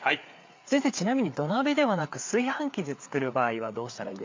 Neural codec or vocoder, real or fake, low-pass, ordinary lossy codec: codec, 16 kHz, 4 kbps, FreqCodec, larger model; fake; 7.2 kHz; MP3, 64 kbps